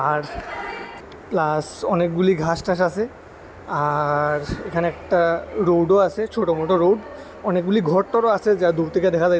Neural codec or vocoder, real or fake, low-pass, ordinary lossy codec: none; real; none; none